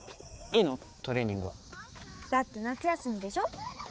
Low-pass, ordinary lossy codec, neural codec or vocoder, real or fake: none; none; codec, 16 kHz, 4 kbps, X-Codec, HuBERT features, trained on balanced general audio; fake